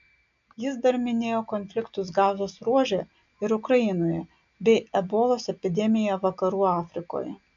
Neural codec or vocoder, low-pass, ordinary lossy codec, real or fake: none; 7.2 kHz; AAC, 96 kbps; real